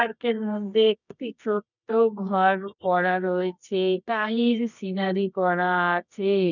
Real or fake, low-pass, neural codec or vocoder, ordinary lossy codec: fake; 7.2 kHz; codec, 24 kHz, 0.9 kbps, WavTokenizer, medium music audio release; none